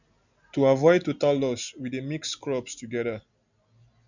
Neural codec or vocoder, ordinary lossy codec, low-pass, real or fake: none; none; 7.2 kHz; real